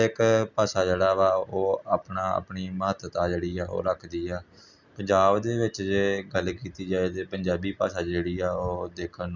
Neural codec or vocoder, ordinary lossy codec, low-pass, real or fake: none; none; 7.2 kHz; real